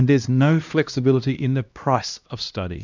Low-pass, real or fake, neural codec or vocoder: 7.2 kHz; fake; codec, 16 kHz, 1 kbps, X-Codec, HuBERT features, trained on LibriSpeech